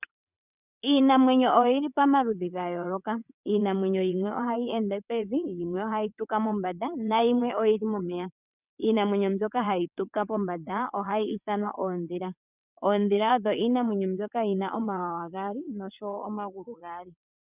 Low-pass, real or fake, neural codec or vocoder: 3.6 kHz; fake; vocoder, 22.05 kHz, 80 mel bands, WaveNeXt